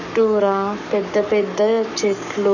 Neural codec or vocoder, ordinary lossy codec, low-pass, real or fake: codec, 44.1 kHz, 7.8 kbps, Pupu-Codec; none; 7.2 kHz; fake